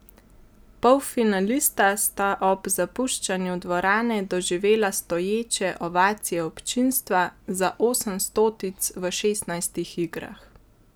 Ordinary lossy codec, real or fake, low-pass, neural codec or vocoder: none; real; none; none